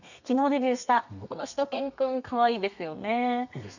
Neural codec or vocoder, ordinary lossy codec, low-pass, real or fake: codec, 32 kHz, 1.9 kbps, SNAC; none; 7.2 kHz; fake